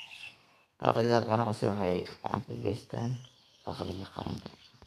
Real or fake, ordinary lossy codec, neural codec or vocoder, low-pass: fake; none; codec, 32 kHz, 1.9 kbps, SNAC; 14.4 kHz